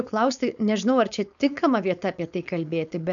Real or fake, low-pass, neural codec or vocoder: fake; 7.2 kHz; codec, 16 kHz, 4.8 kbps, FACodec